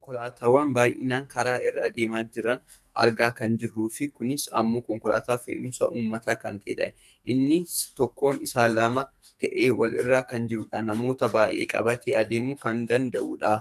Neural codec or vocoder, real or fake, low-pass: codec, 44.1 kHz, 2.6 kbps, SNAC; fake; 14.4 kHz